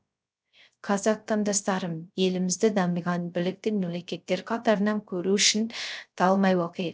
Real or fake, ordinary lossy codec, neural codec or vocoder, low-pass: fake; none; codec, 16 kHz, 0.3 kbps, FocalCodec; none